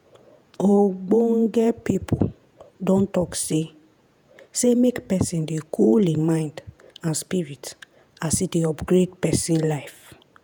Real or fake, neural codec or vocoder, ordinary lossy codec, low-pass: fake; vocoder, 48 kHz, 128 mel bands, Vocos; none; none